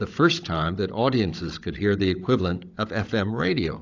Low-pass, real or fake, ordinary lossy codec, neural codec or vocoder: 7.2 kHz; fake; AAC, 48 kbps; codec, 16 kHz, 16 kbps, FunCodec, trained on Chinese and English, 50 frames a second